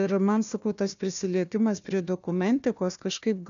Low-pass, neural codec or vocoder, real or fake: 7.2 kHz; codec, 16 kHz, 1 kbps, FunCodec, trained on Chinese and English, 50 frames a second; fake